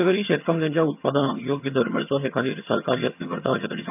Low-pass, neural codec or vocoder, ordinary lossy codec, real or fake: 3.6 kHz; vocoder, 22.05 kHz, 80 mel bands, HiFi-GAN; none; fake